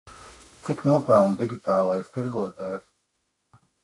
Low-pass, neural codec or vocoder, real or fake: 10.8 kHz; autoencoder, 48 kHz, 32 numbers a frame, DAC-VAE, trained on Japanese speech; fake